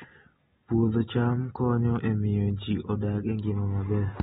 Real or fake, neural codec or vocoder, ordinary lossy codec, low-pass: real; none; AAC, 16 kbps; 19.8 kHz